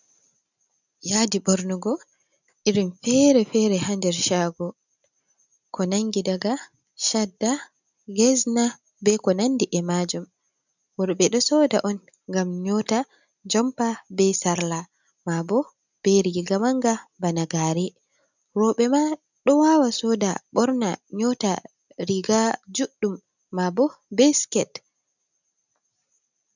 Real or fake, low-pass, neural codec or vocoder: real; 7.2 kHz; none